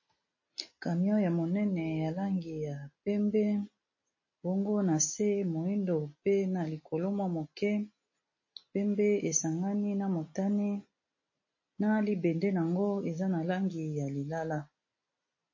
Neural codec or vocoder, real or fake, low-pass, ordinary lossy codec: none; real; 7.2 kHz; MP3, 32 kbps